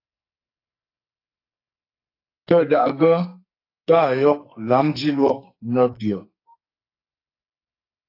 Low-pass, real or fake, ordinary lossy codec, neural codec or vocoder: 5.4 kHz; fake; AAC, 32 kbps; codec, 44.1 kHz, 2.6 kbps, SNAC